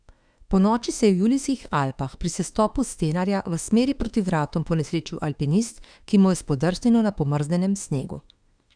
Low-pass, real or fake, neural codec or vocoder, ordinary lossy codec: 9.9 kHz; fake; autoencoder, 48 kHz, 32 numbers a frame, DAC-VAE, trained on Japanese speech; none